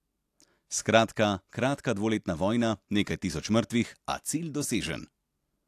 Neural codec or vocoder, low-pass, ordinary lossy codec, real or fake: none; 14.4 kHz; AAC, 64 kbps; real